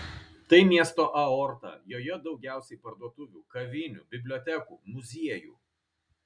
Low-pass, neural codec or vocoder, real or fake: 9.9 kHz; none; real